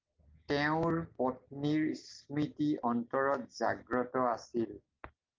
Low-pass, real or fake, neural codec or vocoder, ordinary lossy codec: 7.2 kHz; real; none; Opus, 32 kbps